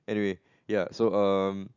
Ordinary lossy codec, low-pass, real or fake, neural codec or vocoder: none; 7.2 kHz; real; none